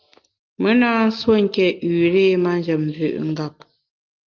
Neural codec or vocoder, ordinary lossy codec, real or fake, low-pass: none; Opus, 32 kbps; real; 7.2 kHz